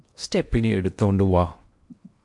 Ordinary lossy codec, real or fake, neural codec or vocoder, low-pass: MP3, 64 kbps; fake; codec, 16 kHz in and 24 kHz out, 0.8 kbps, FocalCodec, streaming, 65536 codes; 10.8 kHz